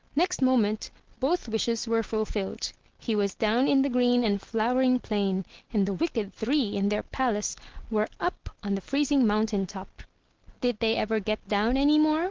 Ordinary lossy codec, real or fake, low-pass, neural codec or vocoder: Opus, 16 kbps; real; 7.2 kHz; none